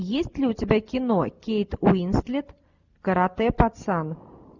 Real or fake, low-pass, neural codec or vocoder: real; 7.2 kHz; none